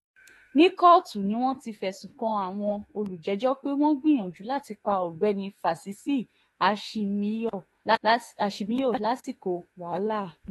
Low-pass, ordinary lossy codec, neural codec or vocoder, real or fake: 19.8 kHz; AAC, 32 kbps; autoencoder, 48 kHz, 32 numbers a frame, DAC-VAE, trained on Japanese speech; fake